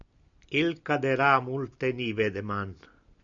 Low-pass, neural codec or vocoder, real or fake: 7.2 kHz; none; real